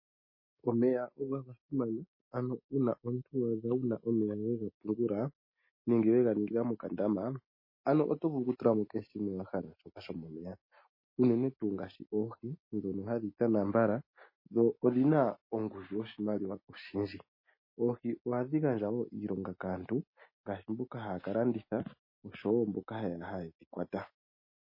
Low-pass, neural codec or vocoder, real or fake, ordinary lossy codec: 5.4 kHz; none; real; MP3, 24 kbps